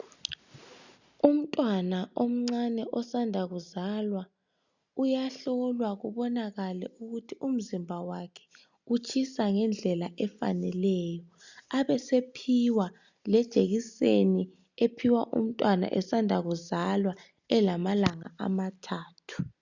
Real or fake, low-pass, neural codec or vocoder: real; 7.2 kHz; none